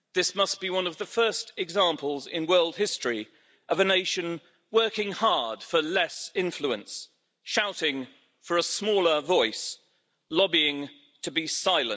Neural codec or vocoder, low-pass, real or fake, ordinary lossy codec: none; none; real; none